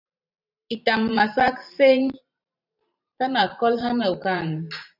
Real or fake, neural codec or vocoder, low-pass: fake; vocoder, 44.1 kHz, 128 mel bands every 512 samples, BigVGAN v2; 5.4 kHz